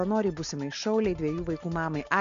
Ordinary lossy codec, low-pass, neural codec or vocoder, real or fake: Opus, 64 kbps; 7.2 kHz; none; real